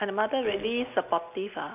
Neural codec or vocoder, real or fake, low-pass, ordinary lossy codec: none; real; 3.6 kHz; none